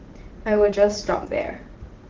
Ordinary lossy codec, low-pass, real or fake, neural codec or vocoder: Opus, 16 kbps; 7.2 kHz; fake; vocoder, 44.1 kHz, 80 mel bands, Vocos